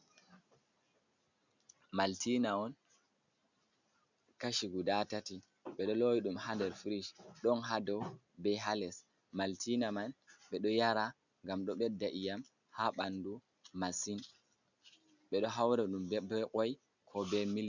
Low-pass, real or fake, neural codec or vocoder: 7.2 kHz; real; none